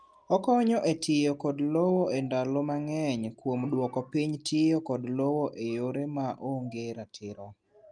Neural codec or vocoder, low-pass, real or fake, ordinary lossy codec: none; 9.9 kHz; real; Opus, 32 kbps